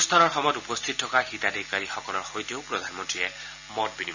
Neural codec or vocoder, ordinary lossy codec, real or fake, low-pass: none; none; real; 7.2 kHz